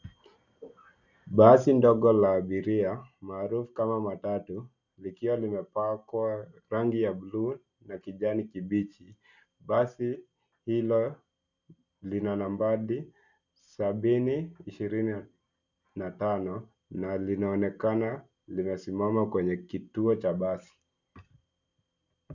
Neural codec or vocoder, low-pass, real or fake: none; 7.2 kHz; real